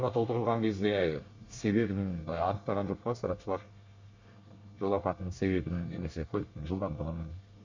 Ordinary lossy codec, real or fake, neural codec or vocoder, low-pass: Opus, 64 kbps; fake; codec, 24 kHz, 1 kbps, SNAC; 7.2 kHz